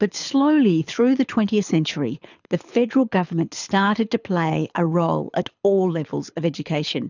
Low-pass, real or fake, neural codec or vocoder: 7.2 kHz; fake; codec, 24 kHz, 6 kbps, HILCodec